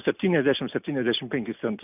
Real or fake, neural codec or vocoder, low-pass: real; none; 3.6 kHz